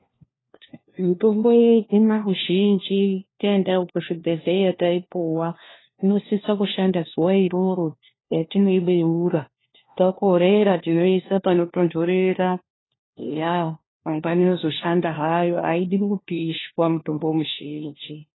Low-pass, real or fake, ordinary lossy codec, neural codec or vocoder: 7.2 kHz; fake; AAC, 16 kbps; codec, 16 kHz, 1 kbps, FunCodec, trained on LibriTTS, 50 frames a second